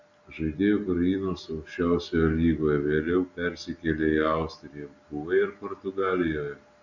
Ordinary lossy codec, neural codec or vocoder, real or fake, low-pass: Opus, 64 kbps; none; real; 7.2 kHz